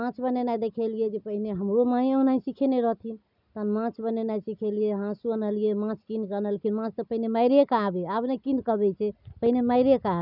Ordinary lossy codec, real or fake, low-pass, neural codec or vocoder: none; real; 5.4 kHz; none